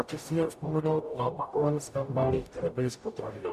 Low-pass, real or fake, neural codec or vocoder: 14.4 kHz; fake; codec, 44.1 kHz, 0.9 kbps, DAC